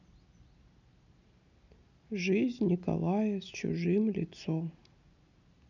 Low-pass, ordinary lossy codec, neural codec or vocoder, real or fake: 7.2 kHz; none; none; real